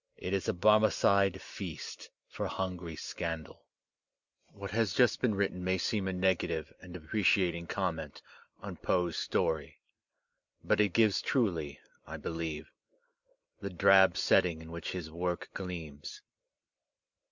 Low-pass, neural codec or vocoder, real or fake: 7.2 kHz; none; real